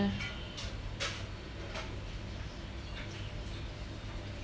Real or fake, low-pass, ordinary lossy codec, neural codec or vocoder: real; none; none; none